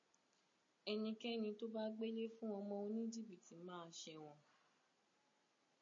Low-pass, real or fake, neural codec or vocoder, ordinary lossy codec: 7.2 kHz; real; none; MP3, 32 kbps